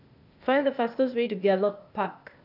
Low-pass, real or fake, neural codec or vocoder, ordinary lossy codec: 5.4 kHz; fake; codec, 16 kHz, 0.8 kbps, ZipCodec; none